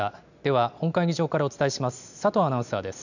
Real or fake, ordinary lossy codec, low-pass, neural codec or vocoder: real; none; 7.2 kHz; none